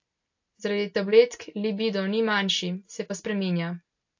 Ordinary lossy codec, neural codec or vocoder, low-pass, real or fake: AAC, 48 kbps; none; 7.2 kHz; real